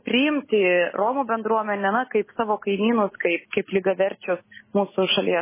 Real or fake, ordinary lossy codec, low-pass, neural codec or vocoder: real; MP3, 16 kbps; 3.6 kHz; none